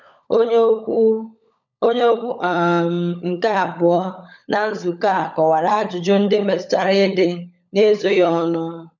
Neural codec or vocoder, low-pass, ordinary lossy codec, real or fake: codec, 16 kHz, 16 kbps, FunCodec, trained on LibriTTS, 50 frames a second; 7.2 kHz; none; fake